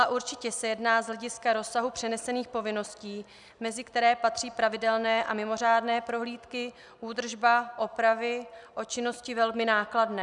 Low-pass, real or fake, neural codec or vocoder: 10.8 kHz; real; none